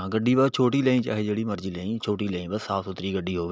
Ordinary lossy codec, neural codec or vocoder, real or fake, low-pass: none; none; real; none